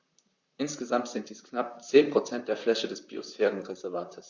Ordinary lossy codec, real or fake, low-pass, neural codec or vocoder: Opus, 64 kbps; fake; 7.2 kHz; vocoder, 44.1 kHz, 128 mel bands, Pupu-Vocoder